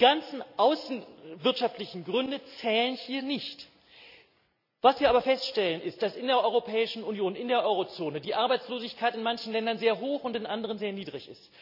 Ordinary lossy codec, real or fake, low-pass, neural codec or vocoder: none; real; 5.4 kHz; none